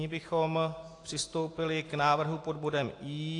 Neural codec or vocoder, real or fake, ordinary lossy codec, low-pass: none; real; AAC, 48 kbps; 10.8 kHz